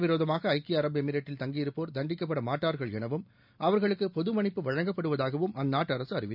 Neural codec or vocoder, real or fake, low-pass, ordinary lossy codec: none; real; 5.4 kHz; none